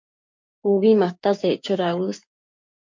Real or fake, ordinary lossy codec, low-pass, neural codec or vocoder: fake; MP3, 48 kbps; 7.2 kHz; codec, 16 kHz, 6 kbps, DAC